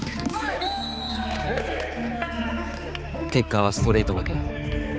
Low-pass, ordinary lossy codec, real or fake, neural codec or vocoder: none; none; fake; codec, 16 kHz, 4 kbps, X-Codec, HuBERT features, trained on balanced general audio